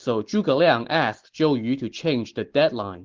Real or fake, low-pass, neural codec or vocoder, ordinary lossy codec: real; 7.2 kHz; none; Opus, 16 kbps